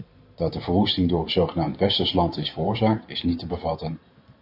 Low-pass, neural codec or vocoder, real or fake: 5.4 kHz; vocoder, 44.1 kHz, 128 mel bands every 512 samples, BigVGAN v2; fake